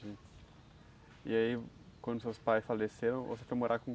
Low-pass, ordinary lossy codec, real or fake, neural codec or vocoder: none; none; real; none